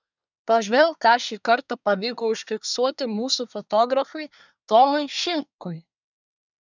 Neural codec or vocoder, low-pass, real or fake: codec, 24 kHz, 1 kbps, SNAC; 7.2 kHz; fake